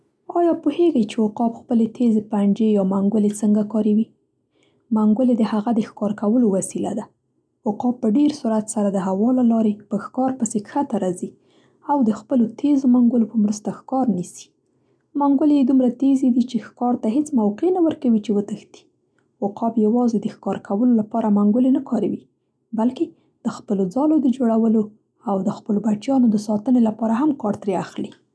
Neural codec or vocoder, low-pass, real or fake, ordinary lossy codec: none; none; real; none